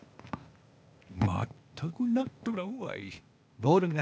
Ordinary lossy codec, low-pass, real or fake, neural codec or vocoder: none; none; fake; codec, 16 kHz, 0.8 kbps, ZipCodec